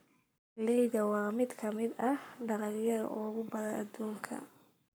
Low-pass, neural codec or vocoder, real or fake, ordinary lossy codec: none; codec, 44.1 kHz, 7.8 kbps, Pupu-Codec; fake; none